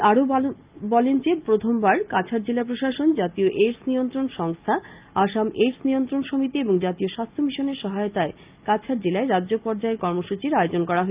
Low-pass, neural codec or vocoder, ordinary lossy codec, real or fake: 3.6 kHz; none; Opus, 24 kbps; real